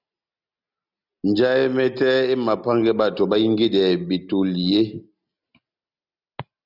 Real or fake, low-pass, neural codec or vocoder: real; 5.4 kHz; none